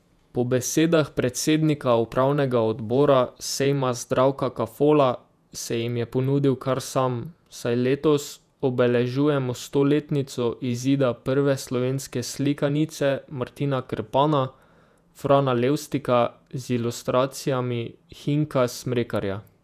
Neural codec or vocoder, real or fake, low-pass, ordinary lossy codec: vocoder, 48 kHz, 128 mel bands, Vocos; fake; 14.4 kHz; none